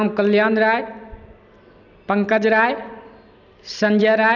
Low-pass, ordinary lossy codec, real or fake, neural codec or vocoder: 7.2 kHz; none; real; none